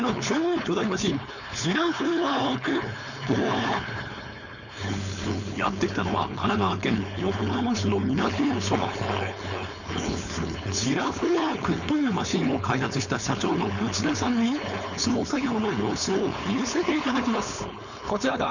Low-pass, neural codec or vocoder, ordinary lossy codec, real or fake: 7.2 kHz; codec, 16 kHz, 4.8 kbps, FACodec; none; fake